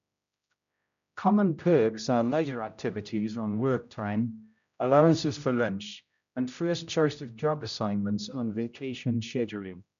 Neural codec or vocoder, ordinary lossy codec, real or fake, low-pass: codec, 16 kHz, 0.5 kbps, X-Codec, HuBERT features, trained on general audio; none; fake; 7.2 kHz